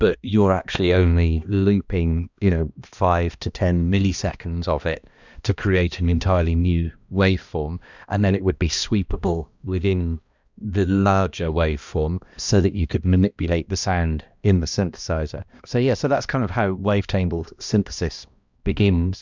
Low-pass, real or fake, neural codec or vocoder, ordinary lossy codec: 7.2 kHz; fake; codec, 16 kHz, 1 kbps, X-Codec, HuBERT features, trained on balanced general audio; Opus, 64 kbps